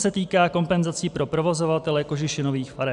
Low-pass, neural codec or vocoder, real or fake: 10.8 kHz; none; real